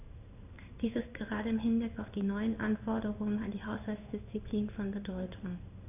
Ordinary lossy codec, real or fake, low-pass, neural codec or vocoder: none; fake; 3.6 kHz; codec, 16 kHz in and 24 kHz out, 1 kbps, XY-Tokenizer